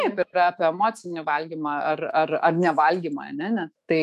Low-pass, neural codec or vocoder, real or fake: 14.4 kHz; autoencoder, 48 kHz, 128 numbers a frame, DAC-VAE, trained on Japanese speech; fake